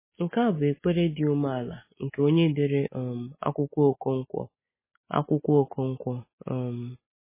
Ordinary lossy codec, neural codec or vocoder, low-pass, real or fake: MP3, 16 kbps; none; 3.6 kHz; real